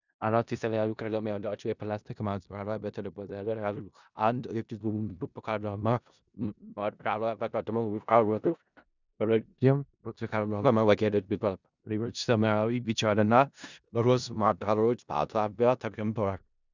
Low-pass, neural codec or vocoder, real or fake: 7.2 kHz; codec, 16 kHz in and 24 kHz out, 0.4 kbps, LongCat-Audio-Codec, four codebook decoder; fake